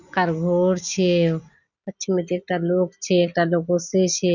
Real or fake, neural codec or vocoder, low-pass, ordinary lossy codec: real; none; 7.2 kHz; none